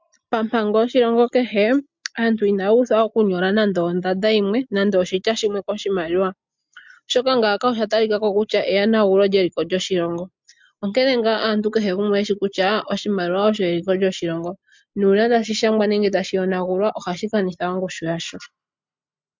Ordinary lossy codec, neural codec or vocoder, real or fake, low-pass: MP3, 64 kbps; none; real; 7.2 kHz